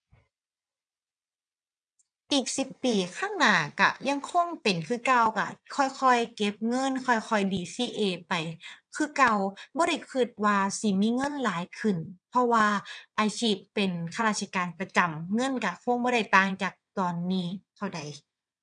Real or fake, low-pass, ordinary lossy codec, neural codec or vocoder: fake; 9.9 kHz; none; vocoder, 22.05 kHz, 80 mel bands, WaveNeXt